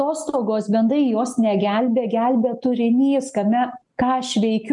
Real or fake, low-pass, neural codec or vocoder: real; 10.8 kHz; none